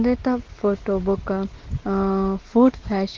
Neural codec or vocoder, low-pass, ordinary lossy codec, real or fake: none; 7.2 kHz; Opus, 16 kbps; real